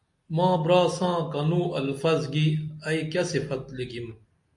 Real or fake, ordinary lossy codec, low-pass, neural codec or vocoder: real; MP3, 64 kbps; 10.8 kHz; none